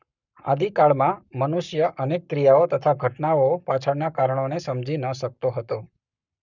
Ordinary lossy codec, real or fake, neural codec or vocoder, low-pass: none; fake; codec, 44.1 kHz, 7.8 kbps, Pupu-Codec; 7.2 kHz